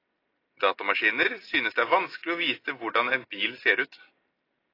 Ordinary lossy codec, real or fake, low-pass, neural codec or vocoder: AAC, 24 kbps; real; 5.4 kHz; none